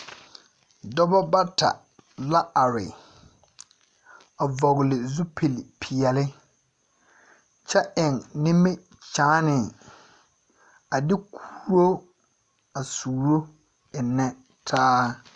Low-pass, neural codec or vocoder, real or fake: 10.8 kHz; none; real